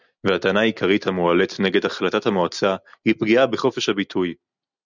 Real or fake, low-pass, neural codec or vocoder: real; 7.2 kHz; none